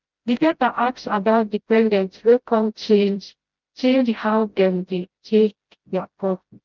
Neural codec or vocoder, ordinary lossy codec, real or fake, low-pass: codec, 16 kHz, 0.5 kbps, FreqCodec, smaller model; Opus, 32 kbps; fake; 7.2 kHz